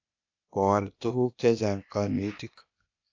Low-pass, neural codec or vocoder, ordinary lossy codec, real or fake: 7.2 kHz; codec, 16 kHz, 0.8 kbps, ZipCodec; AAC, 48 kbps; fake